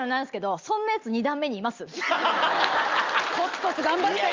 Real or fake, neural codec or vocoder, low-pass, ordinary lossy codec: real; none; 7.2 kHz; Opus, 24 kbps